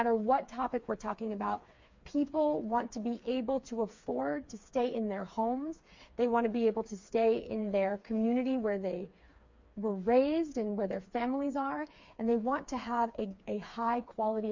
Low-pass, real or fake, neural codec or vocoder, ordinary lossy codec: 7.2 kHz; fake; codec, 16 kHz, 4 kbps, FreqCodec, smaller model; MP3, 48 kbps